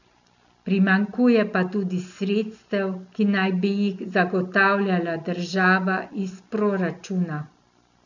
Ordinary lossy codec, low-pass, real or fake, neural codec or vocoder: none; 7.2 kHz; real; none